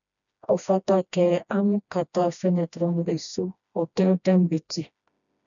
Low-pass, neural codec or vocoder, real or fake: 7.2 kHz; codec, 16 kHz, 1 kbps, FreqCodec, smaller model; fake